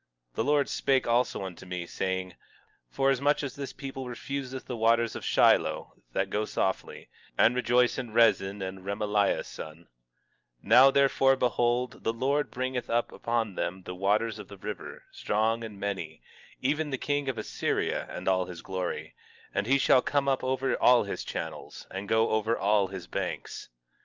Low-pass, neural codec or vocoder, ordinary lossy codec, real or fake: 7.2 kHz; none; Opus, 24 kbps; real